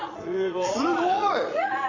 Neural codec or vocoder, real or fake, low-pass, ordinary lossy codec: vocoder, 22.05 kHz, 80 mel bands, Vocos; fake; 7.2 kHz; AAC, 48 kbps